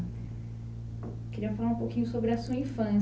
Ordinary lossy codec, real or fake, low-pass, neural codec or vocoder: none; real; none; none